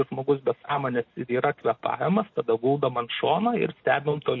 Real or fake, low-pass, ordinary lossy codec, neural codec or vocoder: real; 7.2 kHz; MP3, 32 kbps; none